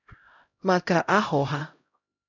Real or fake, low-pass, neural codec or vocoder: fake; 7.2 kHz; codec, 16 kHz, 0.5 kbps, X-Codec, HuBERT features, trained on LibriSpeech